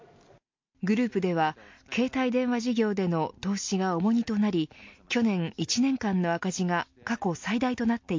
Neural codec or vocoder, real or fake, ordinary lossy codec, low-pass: none; real; MP3, 48 kbps; 7.2 kHz